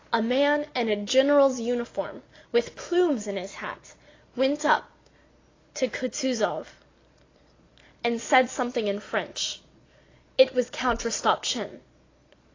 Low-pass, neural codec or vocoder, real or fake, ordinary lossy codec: 7.2 kHz; none; real; AAC, 32 kbps